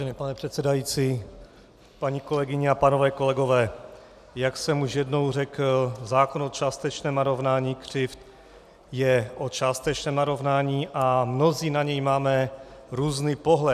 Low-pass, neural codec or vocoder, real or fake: 14.4 kHz; none; real